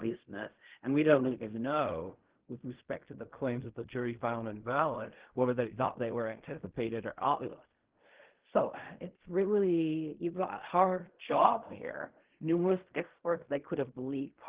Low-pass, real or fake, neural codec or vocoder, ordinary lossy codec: 3.6 kHz; fake; codec, 16 kHz in and 24 kHz out, 0.4 kbps, LongCat-Audio-Codec, fine tuned four codebook decoder; Opus, 16 kbps